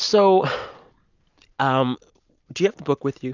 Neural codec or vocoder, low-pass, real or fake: none; 7.2 kHz; real